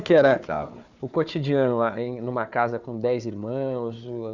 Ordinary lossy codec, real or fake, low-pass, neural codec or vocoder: none; fake; 7.2 kHz; codec, 16 kHz, 4 kbps, FunCodec, trained on Chinese and English, 50 frames a second